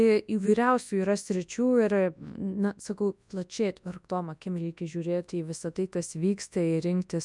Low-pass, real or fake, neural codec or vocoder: 10.8 kHz; fake; codec, 24 kHz, 0.9 kbps, WavTokenizer, large speech release